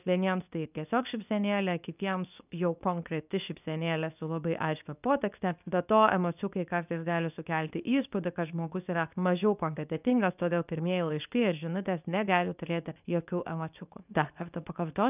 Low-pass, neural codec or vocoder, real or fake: 3.6 kHz; codec, 24 kHz, 0.9 kbps, WavTokenizer, medium speech release version 2; fake